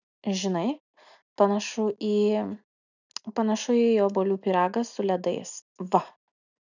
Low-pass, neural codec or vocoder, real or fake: 7.2 kHz; none; real